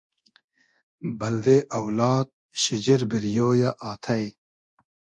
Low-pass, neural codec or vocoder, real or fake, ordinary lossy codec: 10.8 kHz; codec, 24 kHz, 0.9 kbps, DualCodec; fake; MP3, 48 kbps